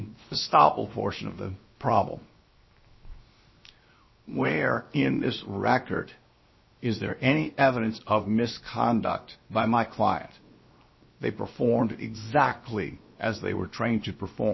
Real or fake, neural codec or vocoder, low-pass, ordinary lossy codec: fake; codec, 16 kHz, 0.7 kbps, FocalCodec; 7.2 kHz; MP3, 24 kbps